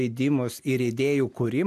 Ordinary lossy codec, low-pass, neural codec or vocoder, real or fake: MP3, 96 kbps; 14.4 kHz; none; real